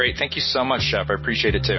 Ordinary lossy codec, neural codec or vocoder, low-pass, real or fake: MP3, 24 kbps; none; 7.2 kHz; real